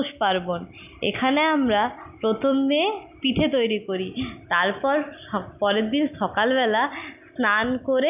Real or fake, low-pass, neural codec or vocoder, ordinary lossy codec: real; 3.6 kHz; none; none